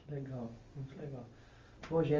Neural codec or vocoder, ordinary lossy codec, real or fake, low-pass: none; Opus, 32 kbps; real; 7.2 kHz